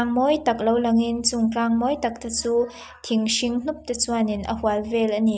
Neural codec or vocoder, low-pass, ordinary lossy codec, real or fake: none; none; none; real